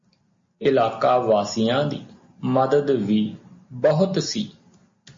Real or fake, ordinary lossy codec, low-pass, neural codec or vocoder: real; MP3, 32 kbps; 7.2 kHz; none